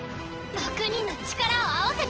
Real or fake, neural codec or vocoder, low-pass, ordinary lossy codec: fake; vocoder, 44.1 kHz, 128 mel bands every 512 samples, BigVGAN v2; 7.2 kHz; Opus, 16 kbps